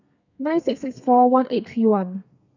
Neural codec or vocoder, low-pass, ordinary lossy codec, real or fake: codec, 32 kHz, 1.9 kbps, SNAC; 7.2 kHz; none; fake